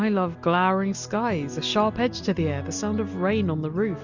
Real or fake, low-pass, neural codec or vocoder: real; 7.2 kHz; none